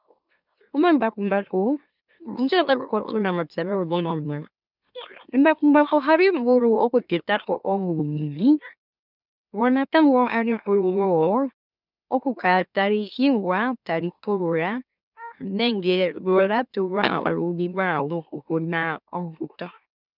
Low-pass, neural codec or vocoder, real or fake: 5.4 kHz; autoencoder, 44.1 kHz, a latent of 192 numbers a frame, MeloTTS; fake